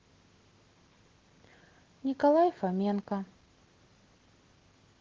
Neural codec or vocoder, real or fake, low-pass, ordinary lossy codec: none; real; 7.2 kHz; Opus, 16 kbps